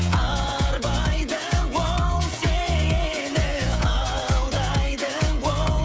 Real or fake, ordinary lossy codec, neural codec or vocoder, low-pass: fake; none; codec, 16 kHz, 16 kbps, FreqCodec, smaller model; none